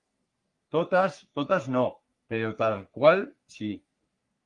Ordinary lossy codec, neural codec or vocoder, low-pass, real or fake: Opus, 32 kbps; codec, 44.1 kHz, 3.4 kbps, Pupu-Codec; 10.8 kHz; fake